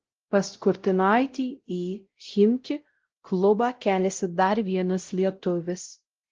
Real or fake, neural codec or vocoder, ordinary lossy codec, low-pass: fake; codec, 16 kHz, 0.5 kbps, X-Codec, WavLM features, trained on Multilingual LibriSpeech; Opus, 16 kbps; 7.2 kHz